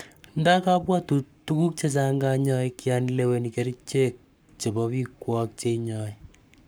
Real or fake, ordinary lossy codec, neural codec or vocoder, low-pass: fake; none; codec, 44.1 kHz, 7.8 kbps, Pupu-Codec; none